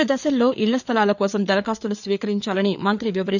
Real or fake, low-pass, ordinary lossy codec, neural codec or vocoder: fake; 7.2 kHz; none; codec, 16 kHz, 4 kbps, FreqCodec, larger model